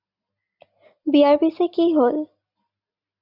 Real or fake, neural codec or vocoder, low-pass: real; none; 5.4 kHz